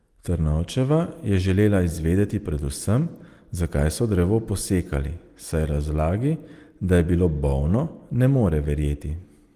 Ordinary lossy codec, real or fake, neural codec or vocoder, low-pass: Opus, 24 kbps; real; none; 14.4 kHz